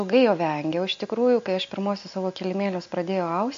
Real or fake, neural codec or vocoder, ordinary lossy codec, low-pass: real; none; MP3, 48 kbps; 7.2 kHz